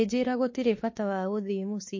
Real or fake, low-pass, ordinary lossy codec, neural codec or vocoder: fake; 7.2 kHz; MP3, 32 kbps; autoencoder, 48 kHz, 32 numbers a frame, DAC-VAE, trained on Japanese speech